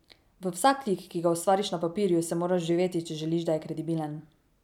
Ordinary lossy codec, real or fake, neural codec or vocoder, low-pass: none; real; none; 19.8 kHz